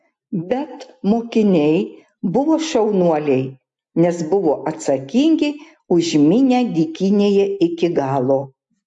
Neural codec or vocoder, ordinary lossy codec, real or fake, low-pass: none; MP3, 48 kbps; real; 10.8 kHz